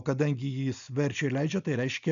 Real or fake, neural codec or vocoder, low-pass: real; none; 7.2 kHz